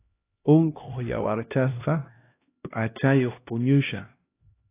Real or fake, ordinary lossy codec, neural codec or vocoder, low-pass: fake; AAC, 24 kbps; codec, 16 kHz, 1 kbps, X-Codec, HuBERT features, trained on LibriSpeech; 3.6 kHz